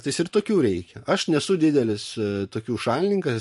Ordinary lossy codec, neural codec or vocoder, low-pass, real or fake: MP3, 48 kbps; vocoder, 44.1 kHz, 128 mel bands every 512 samples, BigVGAN v2; 14.4 kHz; fake